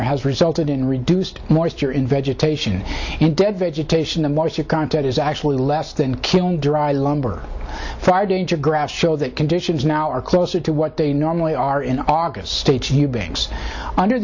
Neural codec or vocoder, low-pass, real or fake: none; 7.2 kHz; real